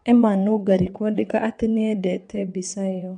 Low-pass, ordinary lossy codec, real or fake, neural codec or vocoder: 9.9 kHz; MP3, 64 kbps; fake; vocoder, 22.05 kHz, 80 mel bands, WaveNeXt